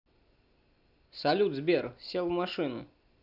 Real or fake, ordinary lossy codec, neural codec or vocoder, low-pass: real; none; none; 5.4 kHz